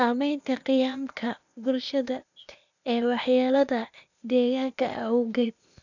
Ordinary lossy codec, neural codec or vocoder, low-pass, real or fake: none; codec, 16 kHz, 0.8 kbps, ZipCodec; 7.2 kHz; fake